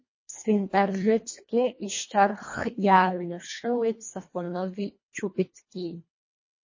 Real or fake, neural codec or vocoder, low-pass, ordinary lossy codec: fake; codec, 24 kHz, 1.5 kbps, HILCodec; 7.2 kHz; MP3, 32 kbps